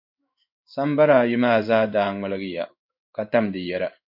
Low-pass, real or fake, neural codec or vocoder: 5.4 kHz; fake; codec, 16 kHz in and 24 kHz out, 1 kbps, XY-Tokenizer